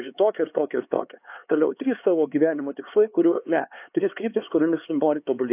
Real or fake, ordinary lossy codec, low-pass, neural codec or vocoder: fake; AAC, 32 kbps; 3.6 kHz; codec, 16 kHz, 4 kbps, X-Codec, HuBERT features, trained on LibriSpeech